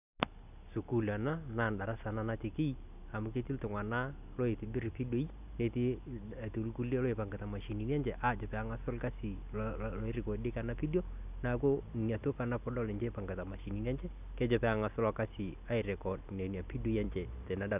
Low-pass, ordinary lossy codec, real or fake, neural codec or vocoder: 3.6 kHz; none; real; none